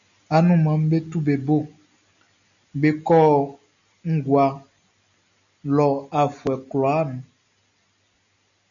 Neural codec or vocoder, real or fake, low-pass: none; real; 7.2 kHz